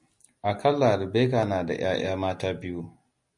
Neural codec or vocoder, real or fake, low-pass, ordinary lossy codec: none; real; 10.8 kHz; MP3, 48 kbps